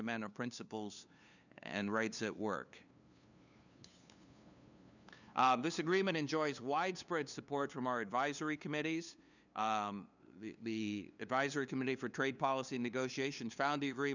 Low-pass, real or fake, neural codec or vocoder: 7.2 kHz; fake; codec, 16 kHz, 2 kbps, FunCodec, trained on LibriTTS, 25 frames a second